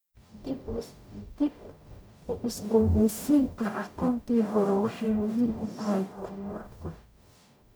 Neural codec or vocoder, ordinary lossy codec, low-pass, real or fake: codec, 44.1 kHz, 0.9 kbps, DAC; none; none; fake